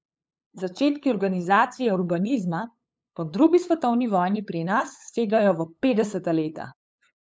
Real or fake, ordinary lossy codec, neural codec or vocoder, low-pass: fake; none; codec, 16 kHz, 8 kbps, FunCodec, trained on LibriTTS, 25 frames a second; none